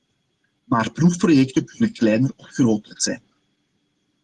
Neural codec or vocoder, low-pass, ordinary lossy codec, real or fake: none; 10.8 kHz; Opus, 16 kbps; real